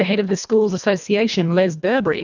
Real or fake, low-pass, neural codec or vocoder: fake; 7.2 kHz; codec, 24 kHz, 1.5 kbps, HILCodec